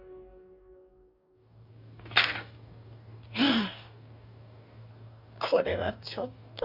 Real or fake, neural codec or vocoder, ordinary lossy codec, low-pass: fake; codec, 44.1 kHz, 2.6 kbps, DAC; none; 5.4 kHz